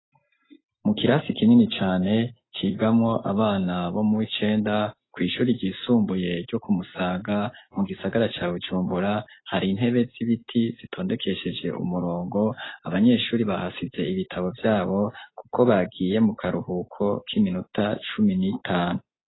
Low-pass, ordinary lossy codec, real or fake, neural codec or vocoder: 7.2 kHz; AAC, 16 kbps; real; none